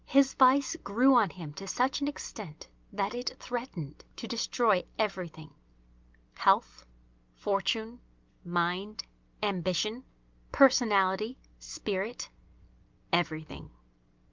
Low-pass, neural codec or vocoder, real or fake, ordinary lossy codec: 7.2 kHz; none; real; Opus, 24 kbps